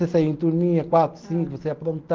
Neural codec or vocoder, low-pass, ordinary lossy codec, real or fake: none; 7.2 kHz; Opus, 16 kbps; real